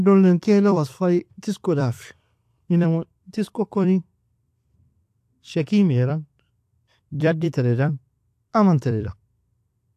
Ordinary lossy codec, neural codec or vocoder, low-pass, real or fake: AAC, 64 kbps; vocoder, 44.1 kHz, 128 mel bands every 256 samples, BigVGAN v2; 14.4 kHz; fake